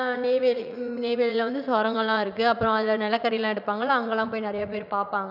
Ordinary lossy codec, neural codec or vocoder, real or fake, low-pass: none; vocoder, 44.1 kHz, 80 mel bands, Vocos; fake; 5.4 kHz